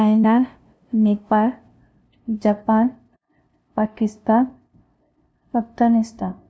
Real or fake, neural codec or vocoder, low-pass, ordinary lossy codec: fake; codec, 16 kHz, 0.5 kbps, FunCodec, trained on LibriTTS, 25 frames a second; none; none